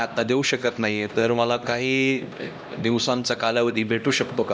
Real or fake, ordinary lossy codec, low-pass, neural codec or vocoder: fake; none; none; codec, 16 kHz, 1 kbps, X-Codec, HuBERT features, trained on LibriSpeech